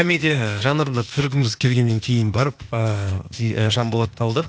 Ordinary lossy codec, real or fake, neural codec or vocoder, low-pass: none; fake; codec, 16 kHz, 0.8 kbps, ZipCodec; none